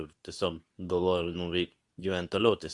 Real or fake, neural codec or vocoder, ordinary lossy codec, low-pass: fake; codec, 24 kHz, 0.9 kbps, WavTokenizer, medium speech release version 2; Opus, 64 kbps; 10.8 kHz